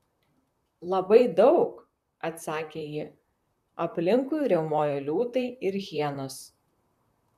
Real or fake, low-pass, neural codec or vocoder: fake; 14.4 kHz; vocoder, 44.1 kHz, 128 mel bands, Pupu-Vocoder